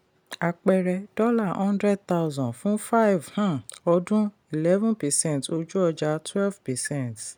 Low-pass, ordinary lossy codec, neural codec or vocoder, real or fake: none; none; none; real